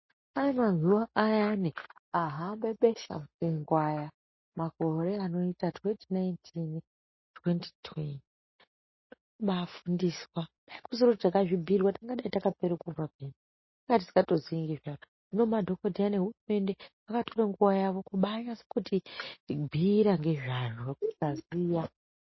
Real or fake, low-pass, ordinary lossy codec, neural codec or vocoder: real; 7.2 kHz; MP3, 24 kbps; none